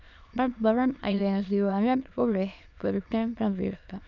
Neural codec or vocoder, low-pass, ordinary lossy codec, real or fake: autoencoder, 22.05 kHz, a latent of 192 numbers a frame, VITS, trained on many speakers; 7.2 kHz; none; fake